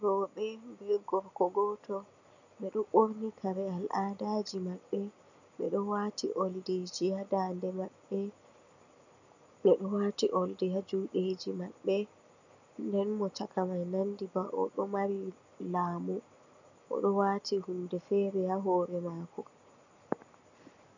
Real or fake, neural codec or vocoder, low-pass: real; none; 7.2 kHz